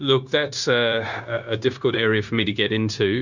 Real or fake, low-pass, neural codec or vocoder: fake; 7.2 kHz; codec, 16 kHz, 0.9 kbps, LongCat-Audio-Codec